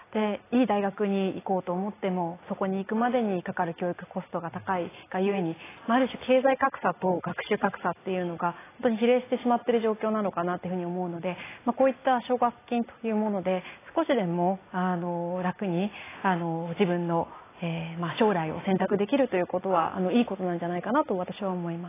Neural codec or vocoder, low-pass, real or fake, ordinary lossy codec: none; 3.6 kHz; real; AAC, 16 kbps